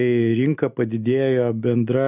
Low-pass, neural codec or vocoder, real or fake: 3.6 kHz; none; real